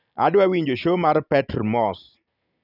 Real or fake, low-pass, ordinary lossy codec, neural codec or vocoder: real; 5.4 kHz; none; none